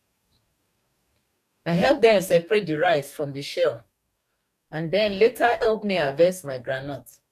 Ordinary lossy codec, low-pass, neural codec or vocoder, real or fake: none; 14.4 kHz; codec, 44.1 kHz, 2.6 kbps, DAC; fake